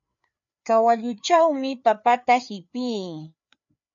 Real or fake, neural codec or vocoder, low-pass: fake; codec, 16 kHz, 4 kbps, FreqCodec, larger model; 7.2 kHz